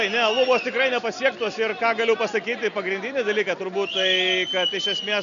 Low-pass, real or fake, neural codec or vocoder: 7.2 kHz; real; none